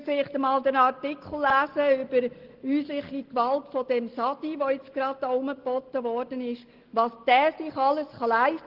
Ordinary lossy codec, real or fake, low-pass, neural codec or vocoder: Opus, 16 kbps; real; 5.4 kHz; none